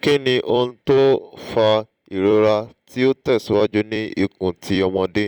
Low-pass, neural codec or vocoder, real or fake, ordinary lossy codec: 19.8 kHz; vocoder, 44.1 kHz, 128 mel bands every 256 samples, BigVGAN v2; fake; none